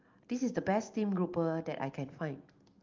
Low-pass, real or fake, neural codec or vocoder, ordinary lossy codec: 7.2 kHz; real; none; Opus, 32 kbps